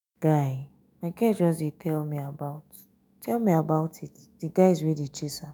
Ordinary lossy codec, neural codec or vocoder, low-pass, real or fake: none; autoencoder, 48 kHz, 128 numbers a frame, DAC-VAE, trained on Japanese speech; none; fake